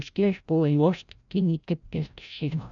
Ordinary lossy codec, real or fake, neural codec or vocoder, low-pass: none; fake; codec, 16 kHz, 0.5 kbps, FreqCodec, larger model; 7.2 kHz